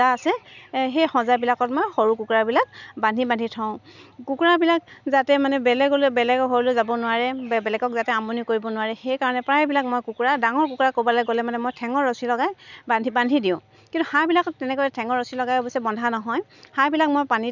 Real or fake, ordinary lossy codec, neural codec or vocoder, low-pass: real; none; none; 7.2 kHz